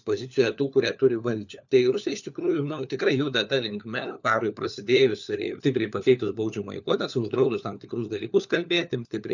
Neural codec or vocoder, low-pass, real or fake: codec, 16 kHz, 4 kbps, FunCodec, trained on LibriTTS, 50 frames a second; 7.2 kHz; fake